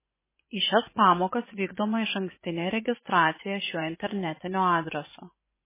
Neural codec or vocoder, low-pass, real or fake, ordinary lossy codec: vocoder, 24 kHz, 100 mel bands, Vocos; 3.6 kHz; fake; MP3, 16 kbps